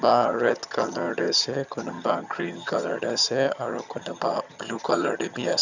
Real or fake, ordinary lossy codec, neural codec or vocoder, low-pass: fake; none; vocoder, 22.05 kHz, 80 mel bands, HiFi-GAN; 7.2 kHz